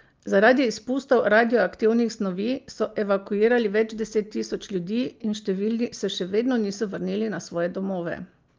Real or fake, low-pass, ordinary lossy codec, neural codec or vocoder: real; 7.2 kHz; Opus, 24 kbps; none